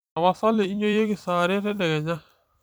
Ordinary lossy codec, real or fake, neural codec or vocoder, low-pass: none; fake; vocoder, 44.1 kHz, 128 mel bands every 256 samples, BigVGAN v2; none